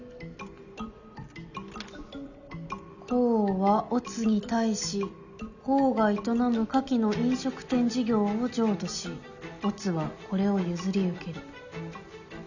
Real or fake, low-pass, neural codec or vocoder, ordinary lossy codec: real; 7.2 kHz; none; none